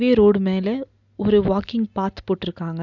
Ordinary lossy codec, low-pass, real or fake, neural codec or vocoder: none; 7.2 kHz; real; none